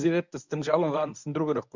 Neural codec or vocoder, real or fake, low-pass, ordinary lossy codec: codec, 24 kHz, 0.9 kbps, WavTokenizer, medium speech release version 1; fake; 7.2 kHz; none